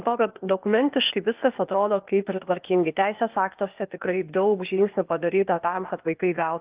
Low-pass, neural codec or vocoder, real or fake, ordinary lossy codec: 3.6 kHz; codec, 16 kHz, 0.8 kbps, ZipCodec; fake; Opus, 32 kbps